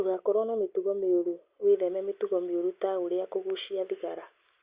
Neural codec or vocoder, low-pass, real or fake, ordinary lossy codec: none; 3.6 kHz; real; Opus, 64 kbps